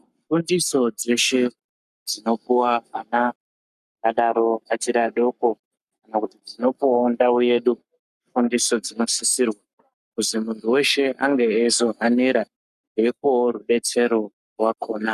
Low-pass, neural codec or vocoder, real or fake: 14.4 kHz; codec, 44.1 kHz, 7.8 kbps, Pupu-Codec; fake